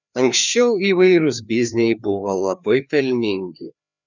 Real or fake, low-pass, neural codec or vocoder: fake; 7.2 kHz; codec, 16 kHz, 2 kbps, FreqCodec, larger model